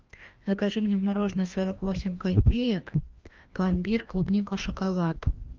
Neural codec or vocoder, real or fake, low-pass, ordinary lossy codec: codec, 16 kHz, 1 kbps, FreqCodec, larger model; fake; 7.2 kHz; Opus, 24 kbps